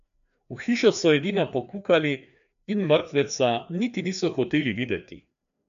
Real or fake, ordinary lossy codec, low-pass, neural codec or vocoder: fake; none; 7.2 kHz; codec, 16 kHz, 2 kbps, FreqCodec, larger model